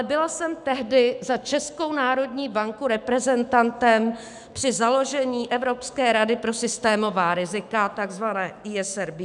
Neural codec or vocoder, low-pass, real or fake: autoencoder, 48 kHz, 128 numbers a frame, DAC-VAE, trained on Japanese speech; 10.8 kHz; fake